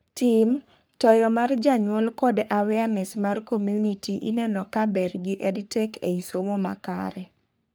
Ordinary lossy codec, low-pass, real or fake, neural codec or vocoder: none; none; fake; codec, 44.1 kHz, 3.4 kbps, Pupu-Codec